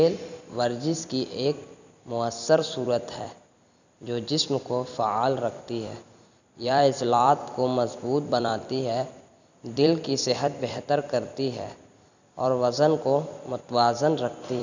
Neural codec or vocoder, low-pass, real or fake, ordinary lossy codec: vocoder, 44.1 kHz, 128 mel bands every 256 samples, BigVGAN v2; 7.2 kHz; fake; none